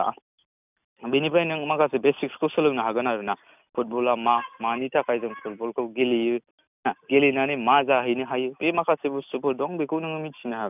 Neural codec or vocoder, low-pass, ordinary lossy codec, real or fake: none; 3.6 kHz; none; real